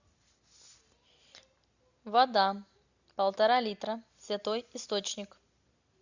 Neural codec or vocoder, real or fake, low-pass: none; real; 7.2 kHz